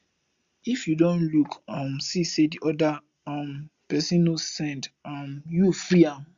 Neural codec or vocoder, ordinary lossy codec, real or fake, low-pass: none; Opus, 64 kbps; real; 7.2 kHz